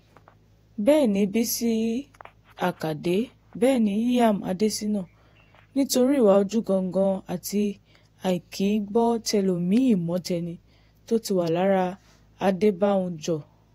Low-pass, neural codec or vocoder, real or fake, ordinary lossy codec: 19.8 kHz; vocoder, 48 kHz, 128 mel bands, Vocos; fake; AAC, 48 kbps